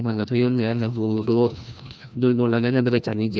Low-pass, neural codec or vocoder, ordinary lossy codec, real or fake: none; codec, 16 kHz, 1 kbps, FreqCodec, larger model; none; fake